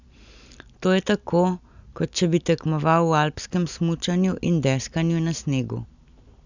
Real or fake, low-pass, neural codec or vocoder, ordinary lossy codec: real; 7.2 kHz; none; none